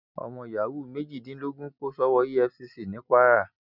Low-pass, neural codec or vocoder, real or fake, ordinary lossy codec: 5.4 kHz; none; real; none